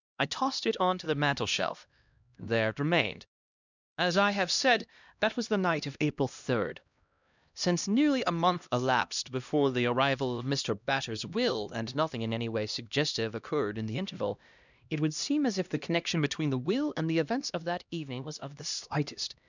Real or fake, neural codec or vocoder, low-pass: fake; codec, 16 kHz, 1 kbps, X-Codec, HuBERT features, trained on LibriSpeech; 7.2 kHz